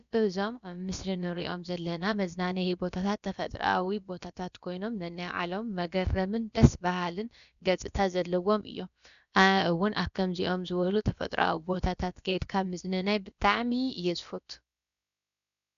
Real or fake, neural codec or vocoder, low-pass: fake; codec, 16 kHz, about 1 kbps, DyCAST, with the encoder's durations; 7.2 kHz